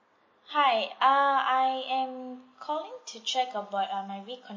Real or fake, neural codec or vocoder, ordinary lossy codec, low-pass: real; none; MP3, 32 kbps; 7.2 kHz